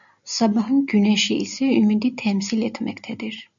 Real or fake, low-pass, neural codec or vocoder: real; 7.2 kHz; none